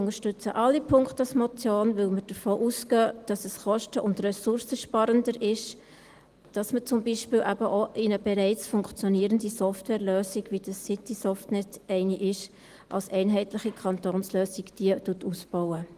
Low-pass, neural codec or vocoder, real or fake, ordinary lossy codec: 14.4 kHz; none; real; Opus, 24 kbps